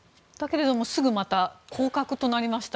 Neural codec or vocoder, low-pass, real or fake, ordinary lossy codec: none; none; real; none